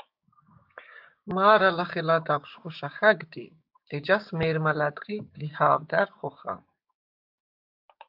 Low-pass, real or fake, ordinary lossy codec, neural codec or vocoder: 5.4 kHz; fake; AAC, 48 kbps; codec, 16 kHz, 6 kbps, DAC